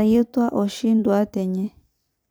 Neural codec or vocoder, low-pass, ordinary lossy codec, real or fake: vocoder, 44.1 kHz, 128 mel bands every 256 samples, BigVGAN v2; none; none; fake